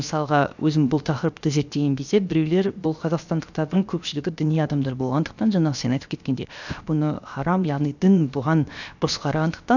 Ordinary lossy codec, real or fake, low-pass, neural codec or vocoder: none; fake; 7.2 kHz; codec, 16 kHz, 0.7 kbps, FocalCodec